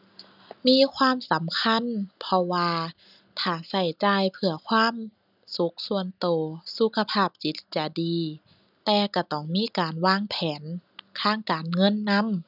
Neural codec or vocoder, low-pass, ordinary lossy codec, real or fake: none; 5.4 kHz; none; real